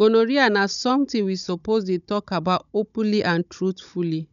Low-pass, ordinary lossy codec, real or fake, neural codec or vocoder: 7.2 kHz; none; fake; codec, 16 kHz, 16 kbps, FunCodec, trained on Chinese and English, 50 frames a second